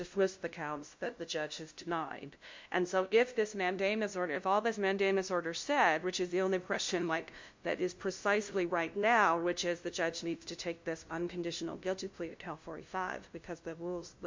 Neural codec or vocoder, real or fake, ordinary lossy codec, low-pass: codec, 16 kHz, 0.5 kbps, FunCodec, trained on LibriTTS, 25 frames a second; fake; MP3, 48 kbps; 7.2 kHz